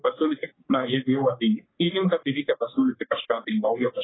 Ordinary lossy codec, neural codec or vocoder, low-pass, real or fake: AAC, 16 kbps; codec, 44.1 kHz, 3.4 kbps, Pupu-Codec; 7.2 kHz; fake